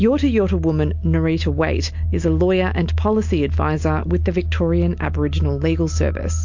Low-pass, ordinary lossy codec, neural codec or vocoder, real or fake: 7.2 kHz; MP3, 48 kbps; none; real